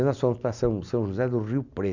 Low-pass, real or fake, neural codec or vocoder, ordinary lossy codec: 7.2 kHz; real; none; none